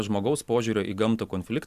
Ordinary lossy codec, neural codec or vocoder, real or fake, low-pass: AAC, 96 kbps; none; real; 14.4 kHz